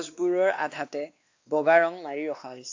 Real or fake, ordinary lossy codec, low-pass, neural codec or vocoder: fake; AAC, 48 kbps; 7.2 kHz; codec, 16 kHz, 2 kbps, X-Codec, WavLM features, trained on Multilingual LibriSpeech